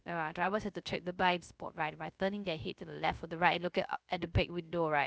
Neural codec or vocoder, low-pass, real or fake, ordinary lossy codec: codec, 16 kHz, 0.3 kbps, FocalCodec; none; fake; none